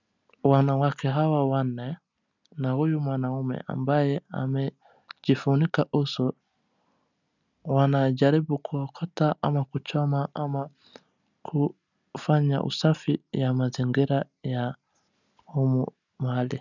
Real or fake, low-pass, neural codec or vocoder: real; 7.2 kHz; none